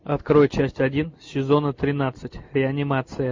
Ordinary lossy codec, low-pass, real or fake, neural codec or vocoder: MP3, 48 kbps; 7.2 kHz; real; none